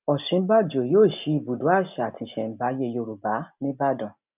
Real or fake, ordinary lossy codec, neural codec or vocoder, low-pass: real; none; none; 3.6 kHz